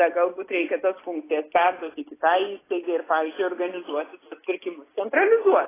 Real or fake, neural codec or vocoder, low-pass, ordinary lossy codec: real; none; 3.6 kHz; AAC, 16 kbps